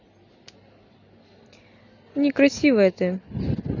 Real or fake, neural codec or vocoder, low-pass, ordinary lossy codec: real; none; 7.2 kHz; none